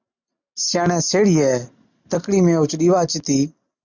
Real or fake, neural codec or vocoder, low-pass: real; none; 7.2 kHz